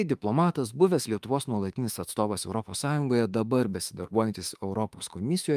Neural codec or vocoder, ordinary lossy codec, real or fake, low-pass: autoencoder, 48 kHz, 32 numbers a frame, DAC-VAE, trained on Japanese speech; Opus, 32 kbps; fake; 14.4 kHz